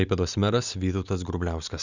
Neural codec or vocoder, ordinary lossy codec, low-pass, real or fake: none; Opus, 64 kbps; 7.2 kHz; real